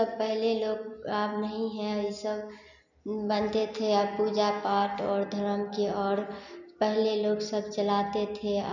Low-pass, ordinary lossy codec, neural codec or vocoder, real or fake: 7.2 kHz; none; none; real